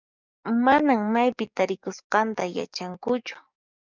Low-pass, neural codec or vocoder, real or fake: 7.2 kHz; codec, 44.1 kHz, 7.8 kbps, DAC; fake